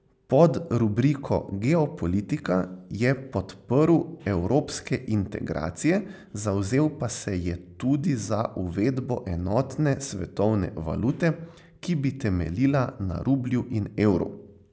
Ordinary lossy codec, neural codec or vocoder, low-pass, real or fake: none; none; none; real